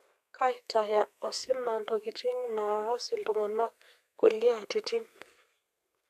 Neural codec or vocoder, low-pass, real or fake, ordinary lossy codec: codec, 32 kHz, 1.9 kbps, SNAC; 14.4 kHz; fake; none